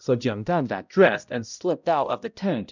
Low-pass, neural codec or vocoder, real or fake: 7.2 kHz; codec, 16 kHz, 0.5 kbps, X-Codec, HuBERT features, trained on balanced general audio; fake